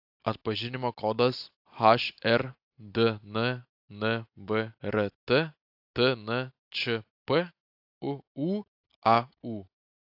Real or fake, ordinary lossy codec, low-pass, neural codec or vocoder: real; AAC, 48 kbps; 5.4 kHz; none